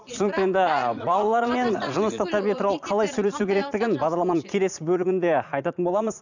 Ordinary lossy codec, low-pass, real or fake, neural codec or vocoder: none; 7.2 kHz; fake; vocoder, 44.1 kHz, 80 mel bands, Vocos